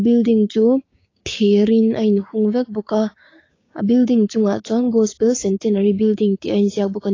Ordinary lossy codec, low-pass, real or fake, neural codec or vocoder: AAC, 32 kbps; 7.2 kHz; fake; codec, 16 kHz, 6 kbps, DAC